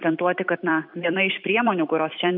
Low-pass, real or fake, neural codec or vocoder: 5.4 kHz; fake; vocoder, 44.1 kHz, 128 mel bands every 256 samples, BigVGAN v2